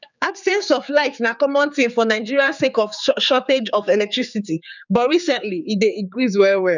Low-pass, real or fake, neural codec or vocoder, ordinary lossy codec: 7.2 kHz; fake; codec, 16 kHz, 4 kbps, X-Codec, HuBERT features, trained on general audio; none